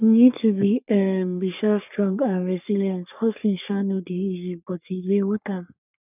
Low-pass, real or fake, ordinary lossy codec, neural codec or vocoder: 3.6 kHz; fake; none; codec, 44.1 kHz, 3.4 kbps, Pupu-Codec